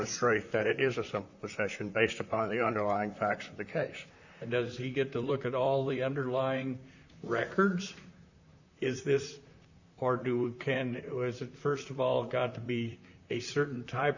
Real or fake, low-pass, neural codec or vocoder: fake; 7.2 kHz; vocoder, 44.1 kHz, 128 mel bands, Pupu-Vocoder